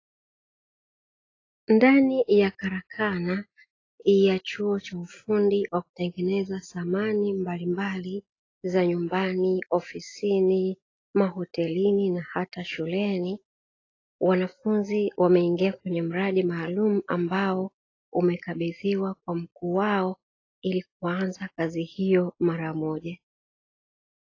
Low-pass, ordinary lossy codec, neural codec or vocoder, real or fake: 7.2 kHz; AAC, 32 kbps; none; real